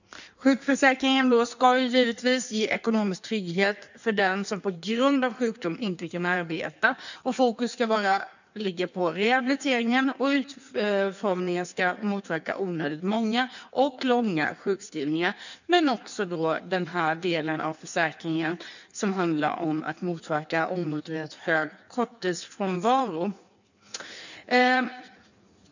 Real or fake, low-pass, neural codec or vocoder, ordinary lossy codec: fake; 7.2 kHz; codec, 16 kHz in and 24 kHz out, 1.1 kbps, FireRedTTS-2 codec; none